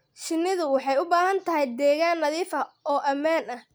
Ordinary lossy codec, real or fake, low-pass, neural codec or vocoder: none; real; none; none